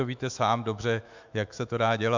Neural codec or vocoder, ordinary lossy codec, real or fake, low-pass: autoencoder, 48 kHz, 128 numbers a frame, DAC-VAE, trained on Japanese speech; MP3, 64 kbps; fake; 7.2 kHz